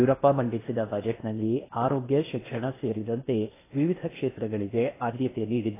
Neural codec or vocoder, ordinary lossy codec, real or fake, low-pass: codec, 16 kHz in and 24 kHz out, 0.8 kbps, FocalCodec, streaming, 65536 codes; AAC, 16 kbps; fake; 3.6 kHz